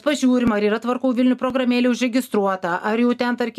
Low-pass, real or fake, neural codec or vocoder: 14.4 kHz; real; none